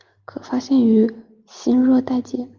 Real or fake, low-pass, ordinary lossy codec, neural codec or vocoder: real; 7.2 kHz; Opus, 24 kbps; none